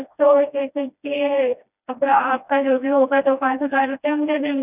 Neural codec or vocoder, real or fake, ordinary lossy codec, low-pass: codec, 16 kHz, 1 kbps, FreqCodec, smaller model; fake; none; 3.6 kHz